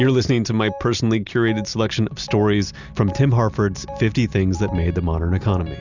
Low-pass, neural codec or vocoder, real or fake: 7.2 kHz; none; real